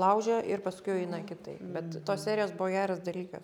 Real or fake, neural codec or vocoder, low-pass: real; none; 19.8 kHz